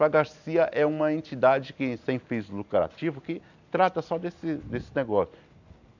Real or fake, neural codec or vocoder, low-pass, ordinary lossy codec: real; none; 7.2 kHz; none